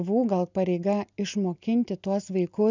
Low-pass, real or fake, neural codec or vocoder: 7.2 kHz; real; none